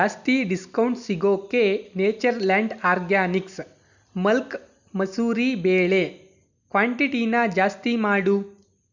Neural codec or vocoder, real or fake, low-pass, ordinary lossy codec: none; real; 7.2 kHz; none